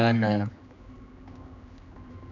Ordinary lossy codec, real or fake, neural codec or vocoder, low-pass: none; fake; codec, 16 kHz, 2 kbps, X-Codec, HuBERT features, trained on general audio; 7.2 kHz